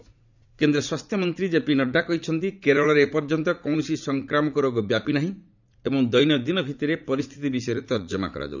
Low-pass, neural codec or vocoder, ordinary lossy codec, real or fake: 7.2 kHz; vocoder, 44.1 kHz, 80 mel bands, Vocos; none; fake